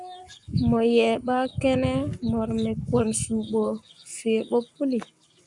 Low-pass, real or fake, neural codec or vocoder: 10.8 kHz; fake; codec, 44.1 kHz, 7.8 kbps, Pupu-Codec